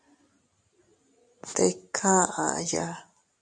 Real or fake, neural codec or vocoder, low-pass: real; none; 9.9 kHz